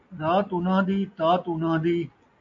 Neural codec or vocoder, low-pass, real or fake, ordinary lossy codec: none; 7.2 kHz; real; AAC, 64 kbps